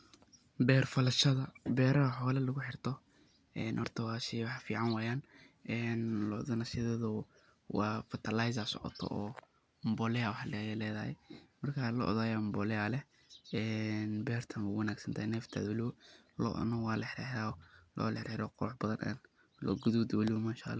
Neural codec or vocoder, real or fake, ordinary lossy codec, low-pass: none; real; none; none